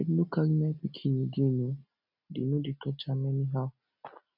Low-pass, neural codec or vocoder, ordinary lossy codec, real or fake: 5.4 kHz; none; none; real